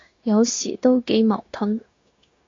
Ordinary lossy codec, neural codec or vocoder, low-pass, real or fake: AAC, 32 kbps; codec, 16 kHz, 0.9 kbps, LongCat-Audio-Codec; 7.2 kHz; fake